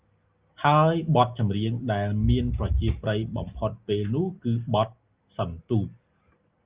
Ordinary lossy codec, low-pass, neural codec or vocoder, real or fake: Opus, 32 kbps; 3.6 kHz; none; real